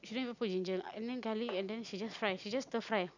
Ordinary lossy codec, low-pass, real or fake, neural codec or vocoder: none; 7.2 kHz; real; none